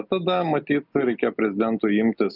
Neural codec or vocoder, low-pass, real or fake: none; 5.4 kHz; real